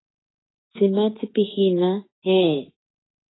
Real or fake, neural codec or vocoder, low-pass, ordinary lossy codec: fake; autoencoder, 48 kHz, 32 numbers a frame, DAC-VAE, trained on Japanese speech; 7.2 kHz; AAC, 16 kbps